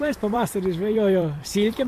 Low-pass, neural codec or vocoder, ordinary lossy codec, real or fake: 14.4 kHz; vocoder, 44.1 kHz, 128 mel bands every 256 samples, BigVGAN v2; MP3, 64 kbps; fake